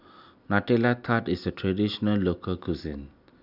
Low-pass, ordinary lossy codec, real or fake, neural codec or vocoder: 5.4 kHz; none; real; none